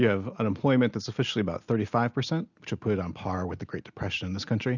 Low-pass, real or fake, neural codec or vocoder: 7.2 kHz; real; none